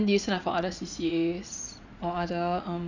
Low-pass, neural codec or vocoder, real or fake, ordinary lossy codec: 7.2 kHz; vocoder, 44.1 kHz, 80 mel bands, Vocos; fake; none